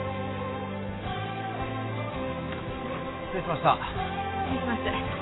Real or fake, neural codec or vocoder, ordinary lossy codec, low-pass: real; none; AAC, 16 kbps; 7.2 kHz